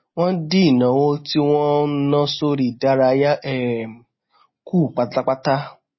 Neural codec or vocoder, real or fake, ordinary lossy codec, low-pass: none; real; MP3, 24 kbps; 7.2 kHz